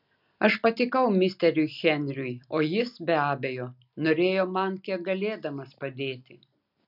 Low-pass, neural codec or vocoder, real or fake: 5.4 kHz; none; real